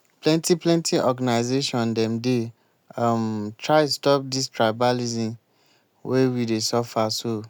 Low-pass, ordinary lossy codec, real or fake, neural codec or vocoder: none; none; real; none